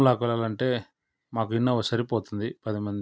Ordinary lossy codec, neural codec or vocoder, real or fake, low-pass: none; none; real; none